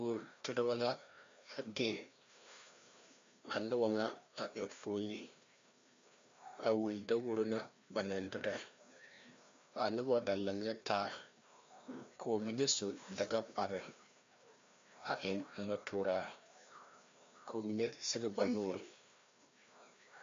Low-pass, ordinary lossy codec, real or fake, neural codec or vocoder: 7.2 kHz; MP3, 48 kbps; fake; codec, 16 kHz, 1 kbps, FreqCodec, larger model